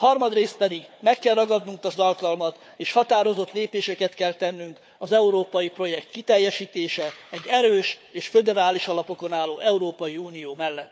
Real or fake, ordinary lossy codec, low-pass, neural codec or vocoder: fake; none; none; codec, 16 kHz, 4 kbps, FunCodec, trained on Chinese and English, 50 frames a second